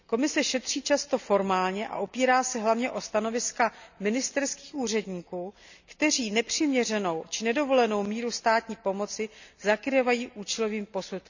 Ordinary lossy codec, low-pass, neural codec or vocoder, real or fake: none; 7.2 kHz; none; real